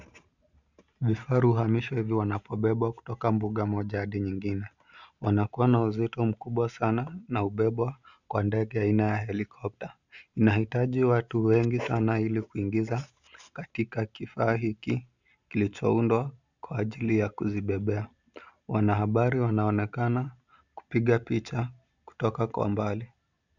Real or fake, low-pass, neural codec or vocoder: real; 7.2 kHz; none